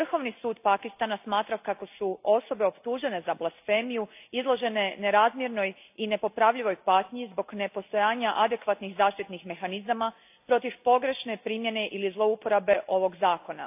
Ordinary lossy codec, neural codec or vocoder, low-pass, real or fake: AAC, 32 kbps; none; 3.6 kHz; real